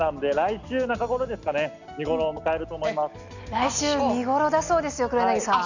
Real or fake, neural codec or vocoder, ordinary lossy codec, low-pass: real; none; none; 7.2 kHz